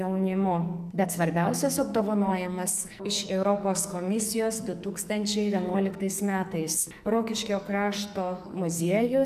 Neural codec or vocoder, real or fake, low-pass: codec, 44.1 kHz, 2.6 kbps, SNAC; fake; 14.4 kHz